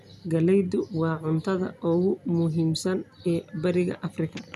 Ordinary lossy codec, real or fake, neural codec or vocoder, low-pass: none; real; none; 14.4 kHz